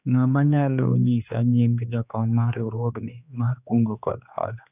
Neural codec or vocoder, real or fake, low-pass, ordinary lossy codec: codec, 16 kHz, 2 kbps, X-Codec, HuBERT features, trained on general audio; fake; 3.6 kHz; none